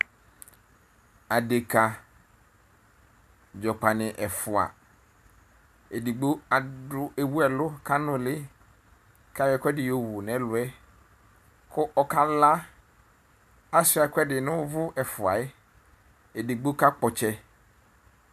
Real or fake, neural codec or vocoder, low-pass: real; none; 14.4 kHz